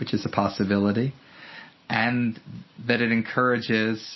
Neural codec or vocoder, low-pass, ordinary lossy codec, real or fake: none; 7.2 kHz; MP3, 24 kbps; real